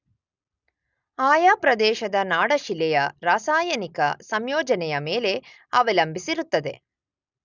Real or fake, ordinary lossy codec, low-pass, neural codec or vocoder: real; none; 7.2 kHz; none